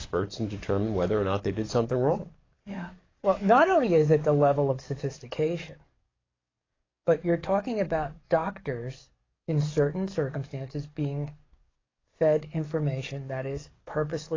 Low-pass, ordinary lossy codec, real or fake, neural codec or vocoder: 7.2 kHz; AAC, 32 kbps; fake; codec, 44.1 kHz, 7.8 kbps, DAC